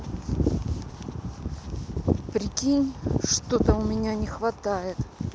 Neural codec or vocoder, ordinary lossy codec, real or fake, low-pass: none; none; real; none